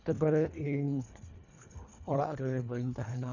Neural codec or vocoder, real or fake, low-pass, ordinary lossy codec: codec, 24 kHz, 1.5 kbps, HILCodec; fake; 7.2 kHz; none